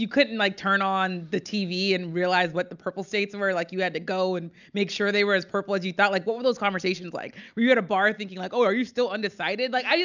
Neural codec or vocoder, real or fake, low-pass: none; real; 7.2 kHz